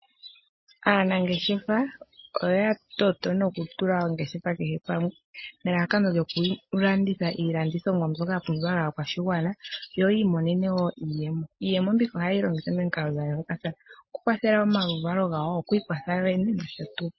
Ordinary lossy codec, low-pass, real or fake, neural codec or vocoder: MP3, 24 kbps; 7.2 kHz; real; none